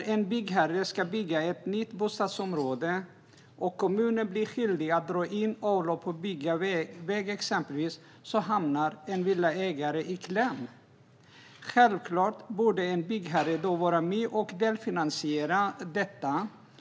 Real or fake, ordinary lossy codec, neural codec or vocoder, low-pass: real; none; none; none